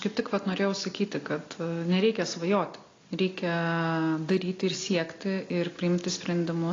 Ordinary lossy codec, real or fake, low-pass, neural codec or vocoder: AAC, 32 kbps; real; 7.2 kHz; none